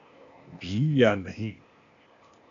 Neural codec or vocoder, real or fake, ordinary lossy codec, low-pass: codec, 16 kHz, 0.8 kbps, ZipCodec; fake; MP3, 64 kbps; 7.2 kHz